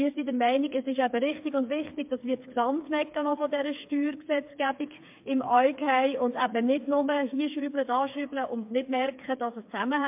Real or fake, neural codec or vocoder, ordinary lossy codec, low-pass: fake; codec, 16 kHz, 4 kbps, FreqCodec, smaller model; MP3, 32 kbps; 3.6 kHz